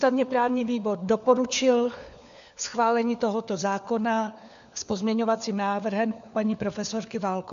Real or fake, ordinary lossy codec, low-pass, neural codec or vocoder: fake; MP3, 96 kbps; 7.2 kHz; codec, 16 kHz, 4 kbps, FunCodec, trained on LibriTTS, 50 frames a second